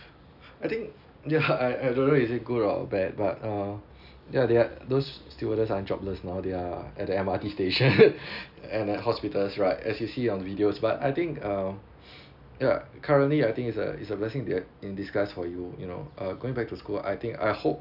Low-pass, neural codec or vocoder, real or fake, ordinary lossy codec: 5.4 kHz; none; real; none